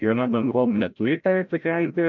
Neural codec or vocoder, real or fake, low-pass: codec, 16 kHz, 0.5 kbps, FreqCodec, larger model; fake; 7.2 kHz